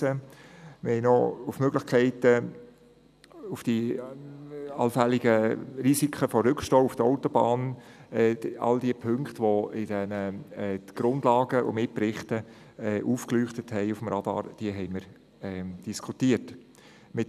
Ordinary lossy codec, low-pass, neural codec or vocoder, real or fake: none; 14.4 kHz; none; real